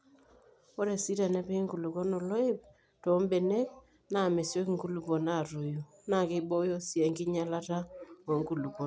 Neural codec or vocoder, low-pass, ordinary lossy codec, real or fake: none; none; none; real